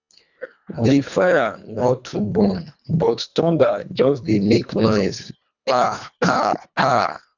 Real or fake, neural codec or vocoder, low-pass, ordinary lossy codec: fake; codec, 24 kHz, 1.5 kbps, HILCodec; 7.2 kHz; none